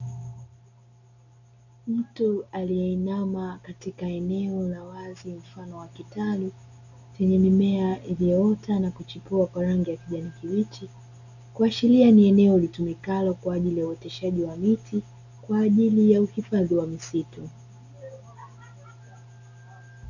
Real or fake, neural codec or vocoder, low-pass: real; none; 7.2 kHz